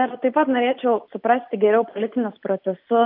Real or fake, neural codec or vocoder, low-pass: real; none; 5.4 kHz